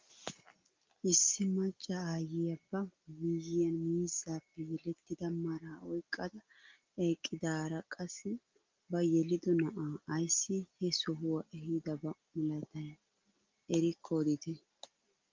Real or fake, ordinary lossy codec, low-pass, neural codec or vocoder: real; Opus, 32 kbps; 7.2 kHz; none